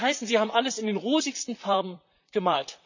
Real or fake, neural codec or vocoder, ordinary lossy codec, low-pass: fake; vocoder, 44.1 kHz, 128 mel bands, Pupu-Vocoder; none; 7.2 kHz